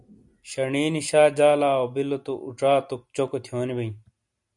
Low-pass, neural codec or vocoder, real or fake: 10.8 kHz; none; real